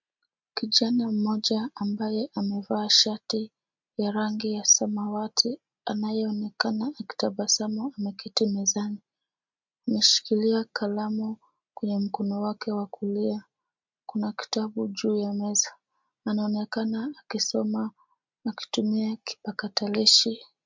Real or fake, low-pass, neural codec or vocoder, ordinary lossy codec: real; 7.2 kHz; none; MP3, 64 kbps